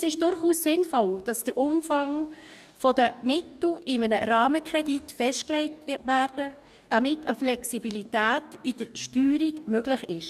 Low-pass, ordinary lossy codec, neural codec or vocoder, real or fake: 14.4 kHz; none; codec, 44.1 kHz, 2.6 kbps, DAC; fake